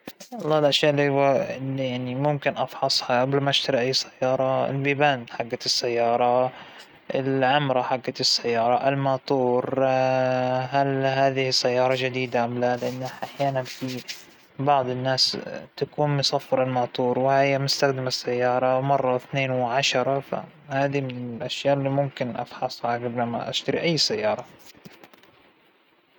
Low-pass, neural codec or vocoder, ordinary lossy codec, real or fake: none; none; none; real